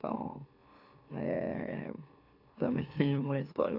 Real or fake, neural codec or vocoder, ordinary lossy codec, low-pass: fake; autoencoder, 44.1 kHz, a latent of 192 numbers a frame, MeloTTS; AAC, 32 kbps; 5.4 kHz